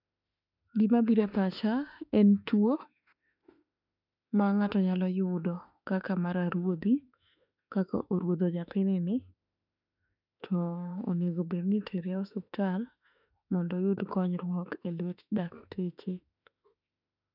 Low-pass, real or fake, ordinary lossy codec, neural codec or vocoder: 5.4 kHz; fake; none; autoencoder, 48 kHz, 32 numbers a frame, DAC-VAE, trained on Japanese speech